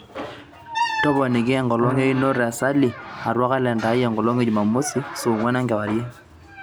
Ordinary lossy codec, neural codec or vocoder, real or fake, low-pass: none; none; real; none